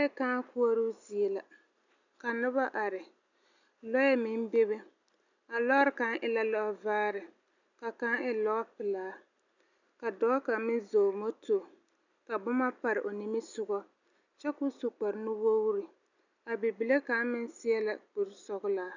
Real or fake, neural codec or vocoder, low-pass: real; none; 7.2 kHz